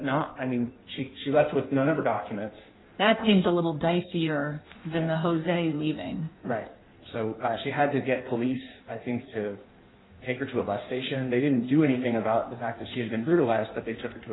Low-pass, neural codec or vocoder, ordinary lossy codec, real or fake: 7.2 kHz; codec, 16 kHz in and 24 kHz out, 1.1 kbps, FireRedTTS-2 codec; AAC, 16 kbps; fake